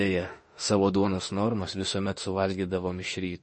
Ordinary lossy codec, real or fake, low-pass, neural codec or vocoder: MP3, 32 kbps; fake; 10.8 kHz; autoencoder, 48 kHz, 32 numbers a frame, DAC-VAE, trained on Japanese speech